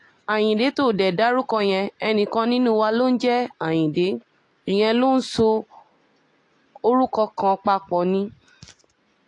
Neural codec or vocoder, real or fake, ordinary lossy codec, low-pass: none; real; AAC, 48 kbps; 10.8 kHz